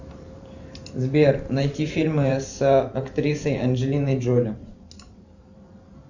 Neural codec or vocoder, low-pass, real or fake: vocoder, 44.1 kHz, 128 mel bands every 512 samples, BigVGAN v2; 7.2 kHz; fake